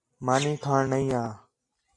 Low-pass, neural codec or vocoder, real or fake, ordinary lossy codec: 10.8 kHz; none; real; AAC, 48 kbps